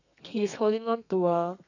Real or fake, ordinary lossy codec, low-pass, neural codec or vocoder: fake; none; 7.2 kHz; codec, 32 kHz, 1.9 kbps, SNAC